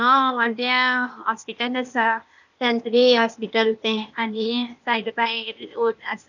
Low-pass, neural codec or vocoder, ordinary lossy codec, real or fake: 7.2 kHz; codec, 16 kHz, 0.8 kbps, ZipCodec; none; fake